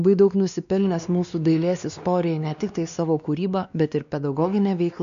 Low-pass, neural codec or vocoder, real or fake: 7.2 kHz; codec, 16 kHz, 2 kbps, X-Codec, WavLM features, trained on Multilingual LibriSpeech; fake